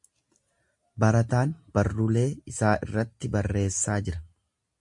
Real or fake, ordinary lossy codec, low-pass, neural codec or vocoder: real; MP3, 64 kbps; 10.8 kHz; none